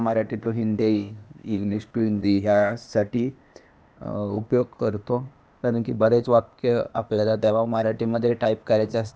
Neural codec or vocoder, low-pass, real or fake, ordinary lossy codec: codec, 16 kHz, 0.8 kbps, ZipCodec; none; fake; none